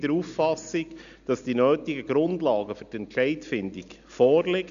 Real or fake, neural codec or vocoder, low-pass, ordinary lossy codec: real; none; 7.2 kHz; none